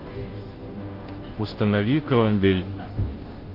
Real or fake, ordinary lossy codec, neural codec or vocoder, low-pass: fake; Opus, 16 kbps; codec, 16 kHz, 0.5 kbps, FunCodec, trained on Chinese and English, 25 frames a second; 5.4 kHz